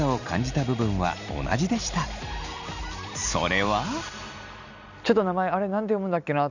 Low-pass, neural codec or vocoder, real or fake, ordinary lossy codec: 7.2 kHz; none; real; none